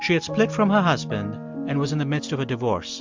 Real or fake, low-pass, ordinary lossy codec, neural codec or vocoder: real; 7.2 kHz; MP3, 64 kbps; none